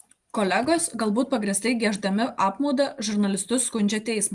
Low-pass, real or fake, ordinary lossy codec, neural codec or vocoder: 10.8 kHz; real; Opus, 24 kbps; none